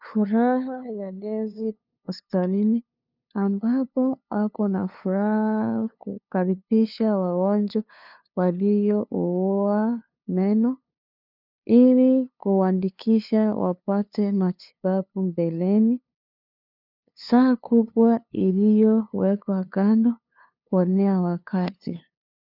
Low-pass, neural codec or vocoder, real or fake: 5.4 kHz; codec, 16 kHz, 2 kbps, FunCodec, trained on LibriTTS, 25 frames a second; fake